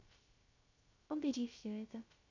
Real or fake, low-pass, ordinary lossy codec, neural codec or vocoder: fake; 7.2 kHz; AAC, 48 kbps; codec, 16 kHz, 0.3 kbps, FocalCodec